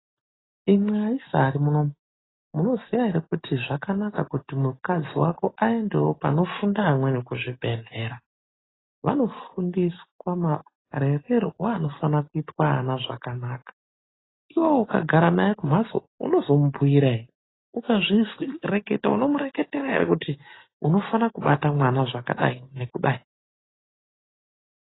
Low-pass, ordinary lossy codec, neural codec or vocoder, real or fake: 7.2 kHz; AAC, 16 kbps; none; real